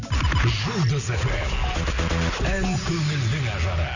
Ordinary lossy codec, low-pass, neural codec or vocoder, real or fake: none; 7.2 kHz; none; real